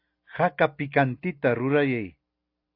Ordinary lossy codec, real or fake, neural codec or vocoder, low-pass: AAC, 32 kbps; real; none; 5.4 kHz